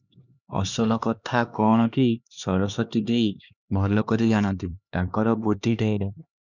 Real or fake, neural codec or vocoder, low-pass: fake; codec, 16 kHz, 2 kbps, X-Codec, HuBERT features, trained on LibriSpeech; 7.2 kHz